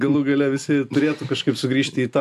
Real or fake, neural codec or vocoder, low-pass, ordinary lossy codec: real; none; 14.4 kHz; AAC, 96 kbps